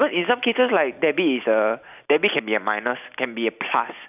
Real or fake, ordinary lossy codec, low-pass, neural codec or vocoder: real; none; 3.6 kHz; none